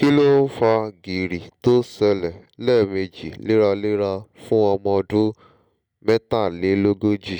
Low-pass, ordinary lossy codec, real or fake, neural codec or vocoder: 19.8 kHz; none; real; none